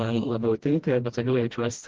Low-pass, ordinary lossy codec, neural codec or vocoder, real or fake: 7.2 kHz; Opus, 16 kbps; codec, 16 kHz, 1 kbps, FreqCodec, smaller model; fake